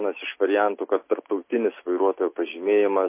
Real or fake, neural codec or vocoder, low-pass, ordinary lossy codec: real; none; 3.6 kHz; MP3, 24 kbps